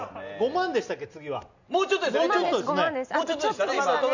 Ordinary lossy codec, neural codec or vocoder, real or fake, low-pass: none; none; real; 7.2 kHz